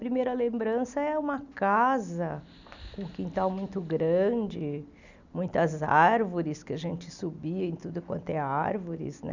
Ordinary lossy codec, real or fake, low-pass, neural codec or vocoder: none; real; 7.2 kHz; none